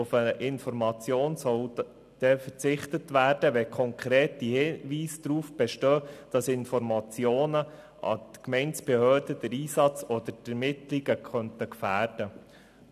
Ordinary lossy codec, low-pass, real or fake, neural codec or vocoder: none; 14.4 kHz; real; none